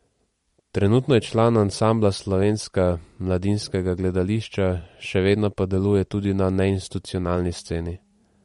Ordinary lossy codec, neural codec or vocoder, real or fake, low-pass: MP3, 48 kbps; vocoder, 44.1 kHz, 128 mel bands every 256 samples, BigVGAN v2; fake; 19.8 kHz